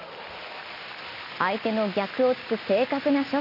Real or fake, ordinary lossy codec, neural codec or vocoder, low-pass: real; none; none; 5.4 kHz